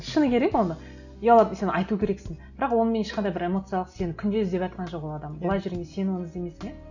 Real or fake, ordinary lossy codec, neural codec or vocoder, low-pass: real; none; none; 7.2 kHz